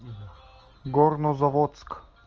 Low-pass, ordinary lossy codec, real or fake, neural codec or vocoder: 7.2 kHz; Opus, 24 kbps; real; none